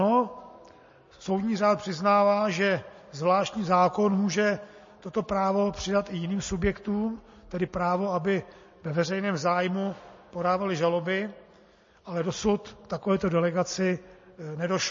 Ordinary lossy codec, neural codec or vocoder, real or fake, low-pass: MP3, 32 kbps; none; real; 7.2 kHz